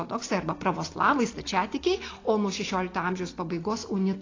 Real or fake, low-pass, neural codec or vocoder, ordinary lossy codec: real; 7.2 kHz; none; AAC, 32 kbps